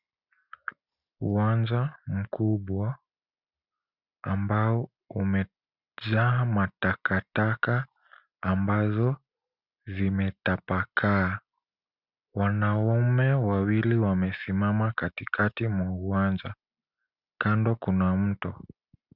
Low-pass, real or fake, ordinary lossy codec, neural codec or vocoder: 5.4 kHz; real; Opus, 64 kbps; none